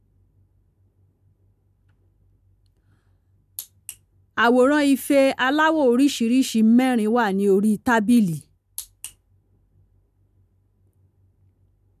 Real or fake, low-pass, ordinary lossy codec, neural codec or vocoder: real; 14.4 kHz; none; none